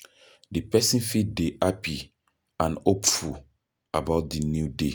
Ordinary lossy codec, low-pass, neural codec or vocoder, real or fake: none; none; none; real